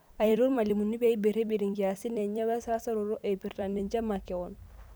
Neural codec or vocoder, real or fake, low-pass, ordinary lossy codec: vocoder, 44.1 kHz, 128 mel bands every 256 samples, BigVGAN v2; fake; none; none